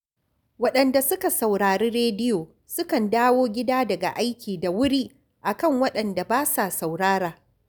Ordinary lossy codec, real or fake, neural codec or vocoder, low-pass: none; real; none; none